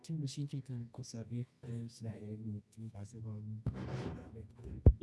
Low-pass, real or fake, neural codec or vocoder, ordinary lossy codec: none; fake; codec, 24 kHz, 0.9 kbps, WavTokenizer, medium music audio release; none